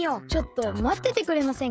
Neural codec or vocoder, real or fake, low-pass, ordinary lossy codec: codec, 16 kHz, 16 kbps, FreqCodec, smaller model; fake; none; none